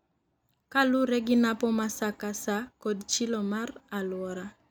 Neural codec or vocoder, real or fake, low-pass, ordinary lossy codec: none; real; none; none